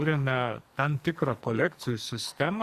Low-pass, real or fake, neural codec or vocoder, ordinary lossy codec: 14.4 kHz; fake; codec, 44.1 kHz, 2.6 kbps, SNAC; Opus, 64 kbps